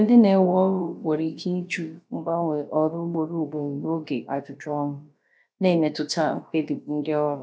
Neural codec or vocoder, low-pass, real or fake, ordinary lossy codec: codec, 16 kHz, about 1 kbps, DyCAST, with the encoder's durations; none; fake; none